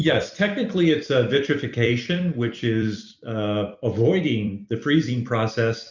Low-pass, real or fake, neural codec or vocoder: 7.2 kHz; fake; vocoder, 44.1 kHz, 128 mel bands every 256 samples, BigVGAN v2